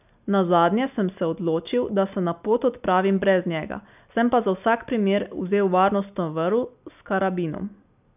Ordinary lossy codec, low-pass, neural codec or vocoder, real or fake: none; 3.6 kHz; none; real